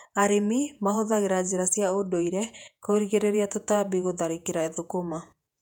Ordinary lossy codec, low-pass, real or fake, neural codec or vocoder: none; 19.8 kHz; fake; vocoder, 48 kHz, 128 mel bands, Vocos